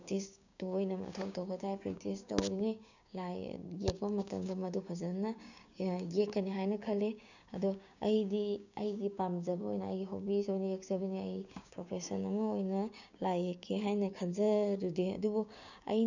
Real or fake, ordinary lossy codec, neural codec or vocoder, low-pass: fake; none; codec, 16 kHz, 6 kbps, DAC; 7.2 kHz